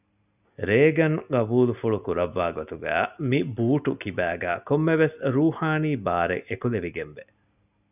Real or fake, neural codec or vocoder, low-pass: real; none; 3.6 kHz